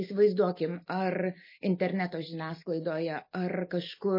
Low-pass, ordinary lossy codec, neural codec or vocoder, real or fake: 5.4 kHz; MP3, 24 kbps; none; real